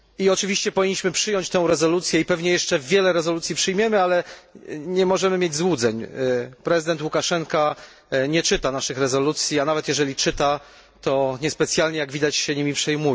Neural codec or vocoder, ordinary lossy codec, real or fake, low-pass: none; none; real; none